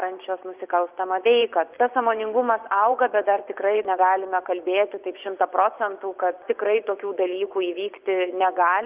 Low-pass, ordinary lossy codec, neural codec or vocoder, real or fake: 3.6 kHz; Opus, 24 kbps; vocoder, 24 kHz, 100 mel bands, Vocos; fake